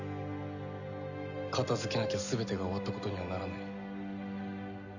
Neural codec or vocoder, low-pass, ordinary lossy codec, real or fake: none; 7.2 kHz; none; real